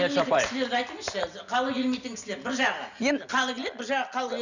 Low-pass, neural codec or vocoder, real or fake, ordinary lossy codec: 7.2 kHz; none; real; none